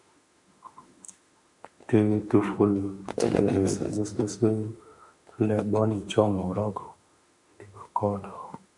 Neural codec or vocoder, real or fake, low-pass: autoencoder, 48 kHz, 32 numbers a frame, DAC-VAE, trained on Japanese speech; fake; 10.8 kHz